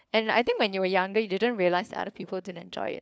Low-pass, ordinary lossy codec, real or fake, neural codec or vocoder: none; none; fake; codec, 16 kHz, 4 kbps, FunCodec, trained on LibriTTS, 50 frames a second